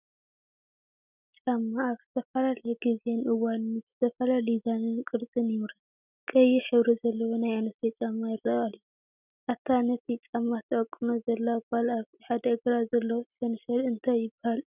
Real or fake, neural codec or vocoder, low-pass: real; none; 3.6 kHz